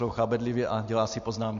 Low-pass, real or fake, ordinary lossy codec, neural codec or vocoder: 7.2 kHz; real; MP3, 48 kbps; none